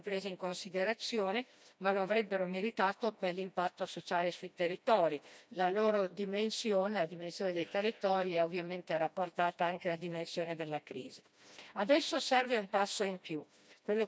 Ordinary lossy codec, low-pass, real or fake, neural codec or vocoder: none; none; fake; codec, 16 kHz, 1 kbps, FreqCodec, smaller model